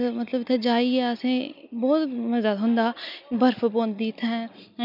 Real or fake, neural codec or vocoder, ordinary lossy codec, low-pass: real; none; none; 5.4 kHz